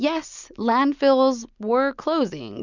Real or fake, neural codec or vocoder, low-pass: real; none; 7.2 kHz